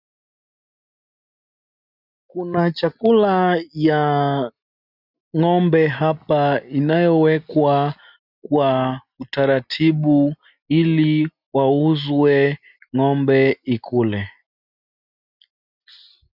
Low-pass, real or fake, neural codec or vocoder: 5.4 kHz; real; none